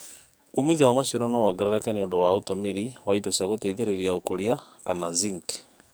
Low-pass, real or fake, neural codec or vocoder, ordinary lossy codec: none; fake; codec, 44.1 kHz, 2.6 kbps, SNAC; none